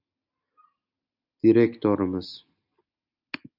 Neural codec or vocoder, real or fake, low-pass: none; real; 5.4 kHz